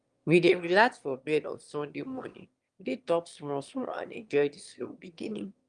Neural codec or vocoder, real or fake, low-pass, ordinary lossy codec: autoencoder, 22.05 kHz, a latent of 192 numbers a frame, VITS, trained on one speaker; fake; 9.9 kHz; Opus, 32 kbps